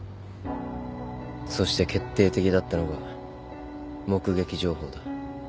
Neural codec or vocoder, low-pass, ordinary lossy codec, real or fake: none; none; none; real